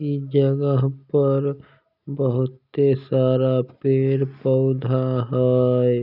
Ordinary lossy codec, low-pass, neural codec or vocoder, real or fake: none; 5.4 kHz; none; real